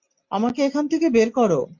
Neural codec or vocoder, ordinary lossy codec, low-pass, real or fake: none; MP3, 48 kbps; 7.2 kHz; real